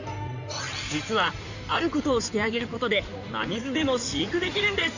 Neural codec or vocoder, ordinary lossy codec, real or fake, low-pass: codec, 16 kHz in and 24 kHz out, 2.2 kbps, FireRedTTS-2 codec; none; fake; 7.2 kHz